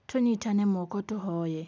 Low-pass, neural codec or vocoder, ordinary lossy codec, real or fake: none; none; none; real